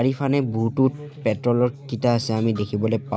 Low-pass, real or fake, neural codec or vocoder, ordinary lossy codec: none; real; none; none